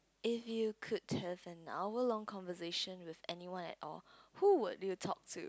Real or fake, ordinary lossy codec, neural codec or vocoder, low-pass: real; none; none; none